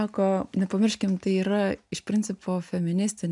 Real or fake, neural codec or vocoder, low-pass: real; none; 10.8 kHz